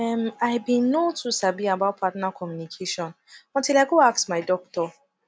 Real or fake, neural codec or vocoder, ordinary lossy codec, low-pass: real; none; none; none